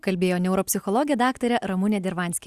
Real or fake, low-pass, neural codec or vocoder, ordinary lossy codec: real; 14.4 kHz; none; Opus, 64 kbps